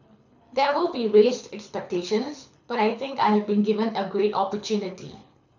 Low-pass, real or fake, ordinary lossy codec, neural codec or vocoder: 7.2 kHz; fake; none; codec, 24 kHz, 6 kbps, HILCodec